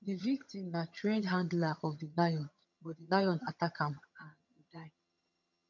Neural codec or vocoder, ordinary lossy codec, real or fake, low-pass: vocoder, 22.05 kHz, 80 mel bands, HiFi-GAN; none; fake; 7.2 kHz